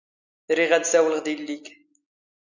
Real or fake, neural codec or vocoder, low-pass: real; none; 7.2 kHz